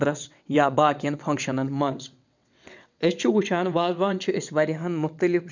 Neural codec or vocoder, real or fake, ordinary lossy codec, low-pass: vocoder, 22.05 kHz, 80 mel bands, WaveNeXt; fake; none; 7.2 kHz